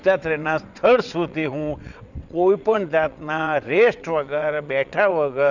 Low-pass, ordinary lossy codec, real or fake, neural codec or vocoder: 7.2 kHz; none; fake; vocoder, 22.05 kHz, 80 mel bands, WaveNeXt